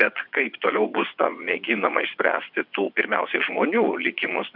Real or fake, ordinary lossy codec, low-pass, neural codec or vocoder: fake; MP3, 48 kbps; 5.4 kHz; vocoder, 22.05 kHz, 80 mel bands, WaveNeXt